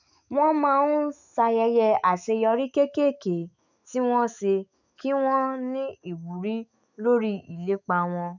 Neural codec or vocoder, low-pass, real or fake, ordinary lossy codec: autoencoder, 48 kHz, 128 numbers a frame, DAC-VAE, trained on Japanese speech; 7.2 kHz; fake; none